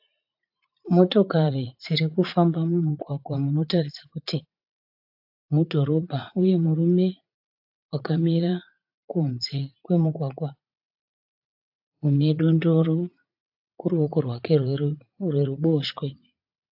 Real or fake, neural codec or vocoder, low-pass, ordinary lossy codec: fake; vocoder, 44.1 kHz, 80 mel bands, Vocos; 5.4 kHz; AAC, 48 kbps